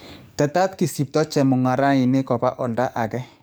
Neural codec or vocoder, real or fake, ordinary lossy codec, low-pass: codec, 44.1 kHz, 7.8 kbps, DAC; fake; none; none